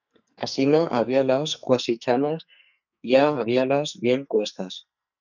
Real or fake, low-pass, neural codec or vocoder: fake; 7.2 kHz; codec, 44.1 kHz, 2.6 kbps, SNAC